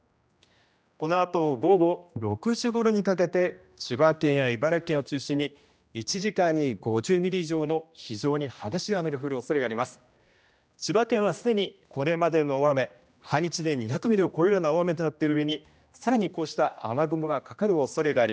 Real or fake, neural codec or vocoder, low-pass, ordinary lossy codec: fake; codec, 16 kHz, 1 kbps, X-Codec, HuBERT features, trained on general audio; none; none